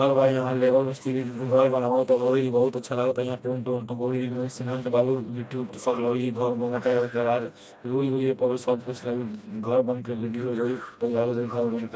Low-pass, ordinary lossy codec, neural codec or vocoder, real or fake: none; none; codec, 16 kHz, 1 kbps, FreqCodec, smaller model; fake